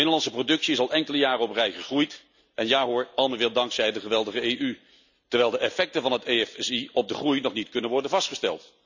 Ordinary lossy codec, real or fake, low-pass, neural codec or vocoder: none; real; 7.2 kHz; none